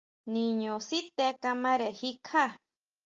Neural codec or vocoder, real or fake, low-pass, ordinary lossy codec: none; real; 7.2 kHz; Opus, 32 kbps